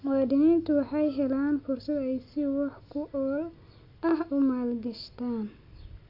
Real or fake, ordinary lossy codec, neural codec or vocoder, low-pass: real; AAC, 48 kbps; none; 5.4 kHz